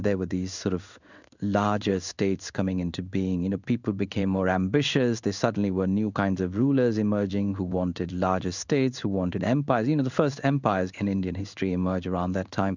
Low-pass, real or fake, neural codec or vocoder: 7.2 kHz; fake; codec, 16 kHz in and 24 kHz out, 1 kbps, XY-Tokenizer